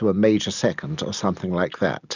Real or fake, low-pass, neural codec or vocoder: real; 7.2 kHz; none